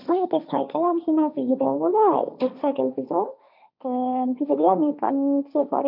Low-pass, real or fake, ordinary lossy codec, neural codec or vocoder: 5.4 kHz; fake; none; codec, 16 kHz, 1.1 kbps, Voila-Tokenizer